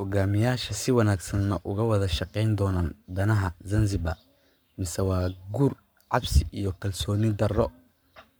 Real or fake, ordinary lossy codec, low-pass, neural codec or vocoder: fake; none; none; codec, 44.1 kHz, 7.8 kbps, Pupu-Codec